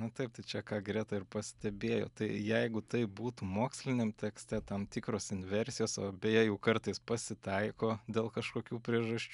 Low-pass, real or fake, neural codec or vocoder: 10.8 kHz; real; none